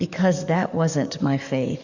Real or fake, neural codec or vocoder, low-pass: fake; codec, 44.1 kHz, 7.8 kbps, DAC; 7.2 kHz